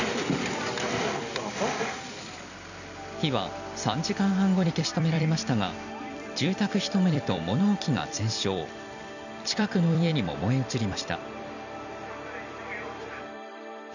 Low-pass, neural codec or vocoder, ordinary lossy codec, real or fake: 7.2 kHz; vocoder, 44.1 kHz, 128 mel bands every 256 samples, BigVGAN v2; none; fake